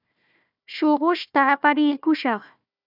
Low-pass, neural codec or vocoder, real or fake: 5.4 kHz; codec, 16 kHz, 1 kbps, FunCodec, trained on Chinese and English, 50 frames a second; fake